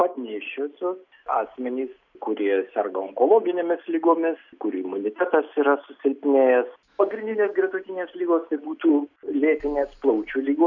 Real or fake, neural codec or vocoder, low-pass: real; none; 7.2 kHz